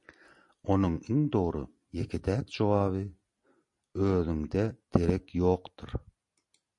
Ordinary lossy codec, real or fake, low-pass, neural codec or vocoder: MP3, 48 kbps; real; 10.8 kHz; none